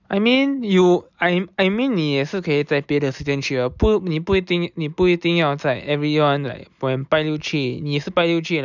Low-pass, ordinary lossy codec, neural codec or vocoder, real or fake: 7.2 kHz; none; none; real